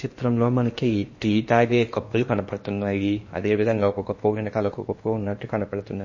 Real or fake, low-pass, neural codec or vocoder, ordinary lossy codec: fake; 7.2 kHz; codec, 16 kHz in and 24 kHz out, 0.8 kbps, FocalCodec, streaming, 65536 codes; MP3, 32 kbps